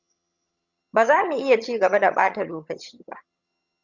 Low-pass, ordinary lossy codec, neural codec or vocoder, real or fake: 7.2 kHz; Opus, 64 kbps; vocoder, 22.05 kHz, 80 mel bands, HiFi-GAN; fake